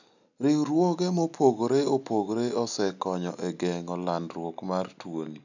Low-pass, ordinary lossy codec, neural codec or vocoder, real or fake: 7.2 kHz; none; none; real